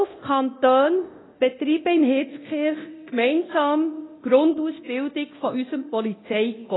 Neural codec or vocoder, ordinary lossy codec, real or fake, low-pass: codec, 24 kHz, 0.9 kbps, DualCodec; AAC, 16 kbps; fake; 7.2 kHz